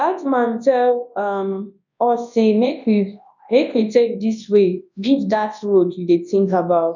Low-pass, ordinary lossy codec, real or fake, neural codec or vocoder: 7.2 kHz; none; fake; codec, 24 kHz, 0.9 kbps, WavTokenizer, large speech release